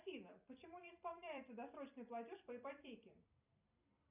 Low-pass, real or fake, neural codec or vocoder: 3.6 kHz; fake; vocoder, 44.1 kHz, 128 mel bands every 256 samples, BigVGAN v2